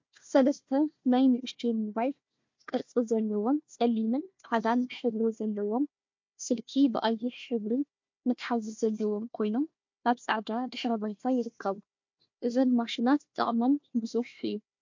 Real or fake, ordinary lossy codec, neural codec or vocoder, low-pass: fake; MP3, 48 kbps; codec, 16 kHz, 1 kbps, FunCodec, trained on Chinese and English, 50 frames a second; 7.2 kHz